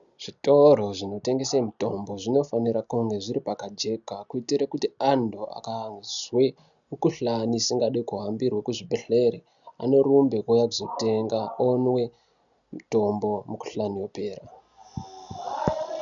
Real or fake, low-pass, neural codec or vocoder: real; 7.2 kHz; none